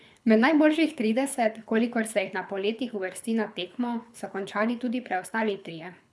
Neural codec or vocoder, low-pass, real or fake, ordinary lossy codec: codec, 24 kHz, 6 kbps, HILCodec; none; fake; none